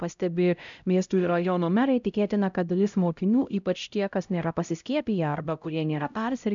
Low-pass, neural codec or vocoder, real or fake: 7.2 kHz; codec, 16 kHz, 0.5 kbps, X-Codec, HuBERT features, trained on LibriSpeech; fake